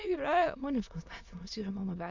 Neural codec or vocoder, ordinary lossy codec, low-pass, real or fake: autoencoder, 22.05 kHz, a latent of 192 numbers a frame, VITS, trained on many speakers; MP3, 64 kbps; 7.2 kHz; fake